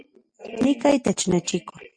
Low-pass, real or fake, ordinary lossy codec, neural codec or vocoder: 9.9 kHz; real; MP3, 48 kbps; none